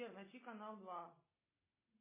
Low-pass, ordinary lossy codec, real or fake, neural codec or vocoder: 3.6 kHz; MP3, 16 kbps; real; none